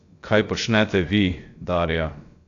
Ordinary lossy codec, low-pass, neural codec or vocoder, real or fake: AAC, 48 kbps; 7.2 kHz; codec, 16 kHz, about 1 kbps, DyCAST, with the encoder's durations; fake